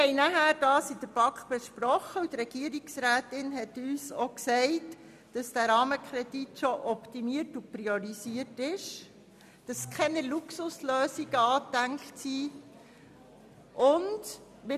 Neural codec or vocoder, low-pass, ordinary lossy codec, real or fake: none; 14.4 kHz; none; real